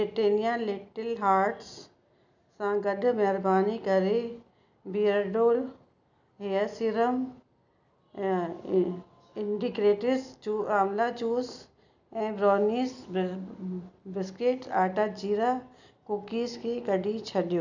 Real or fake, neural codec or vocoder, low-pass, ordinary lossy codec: real; none; 7.2 kHz; none